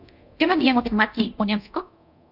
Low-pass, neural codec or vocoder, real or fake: 5.4 kHz; codec, 24 kHz, 0.9 kbps, DualCodec; fake